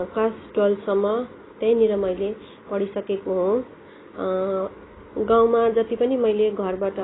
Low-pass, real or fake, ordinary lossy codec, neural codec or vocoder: 7.2 kHz; real; AAC, 16 kbps; none